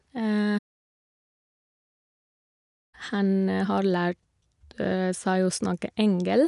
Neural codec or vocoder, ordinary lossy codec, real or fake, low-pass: none; none; real; 10.8 kHz